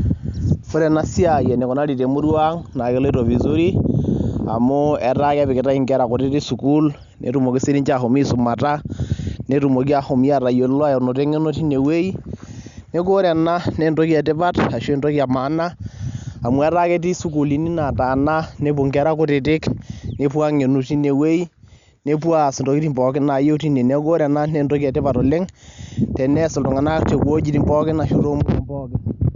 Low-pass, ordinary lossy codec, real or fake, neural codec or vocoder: 7.2 kHz; Opus, 64 kbps; real; none